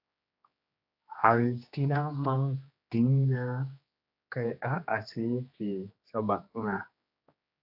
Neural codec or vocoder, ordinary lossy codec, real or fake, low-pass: codec, 16 kHz, 2 kbps, X-Codec, HuBERT features, trained on general audio; AAC, 48 kbps; fake; 5.4 kHz